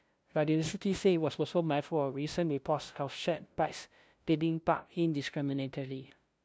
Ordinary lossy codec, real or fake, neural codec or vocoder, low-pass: none; fake; codec, 16 kHz, 0.5 kbps, FunCodec, trained on LibriTTS, 25 frames a second; none